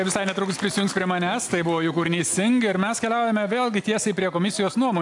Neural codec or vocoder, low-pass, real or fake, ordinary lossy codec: none; 10.8 kHz; real; AAC, 64 kbps